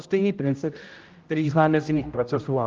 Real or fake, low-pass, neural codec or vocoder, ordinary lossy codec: fake; 7.2 kHz; codec, 16 kHz, 0.5 kbps, X-Codec, HuBERT features, trained on general audio; Opus, 24 kbps